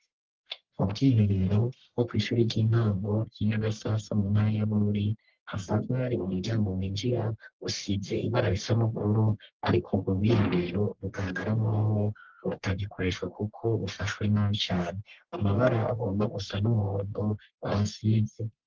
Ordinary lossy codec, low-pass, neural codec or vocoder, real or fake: Opus, 16 kbps; 7.2 kHz; codec, 44.1 kHz, 1.7 kbps, Pupu-Codec; fake